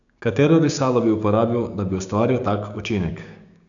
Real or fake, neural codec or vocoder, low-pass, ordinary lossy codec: fake; codec, 16 kHz, 6 kbps, DAC; 7.2 kHz; none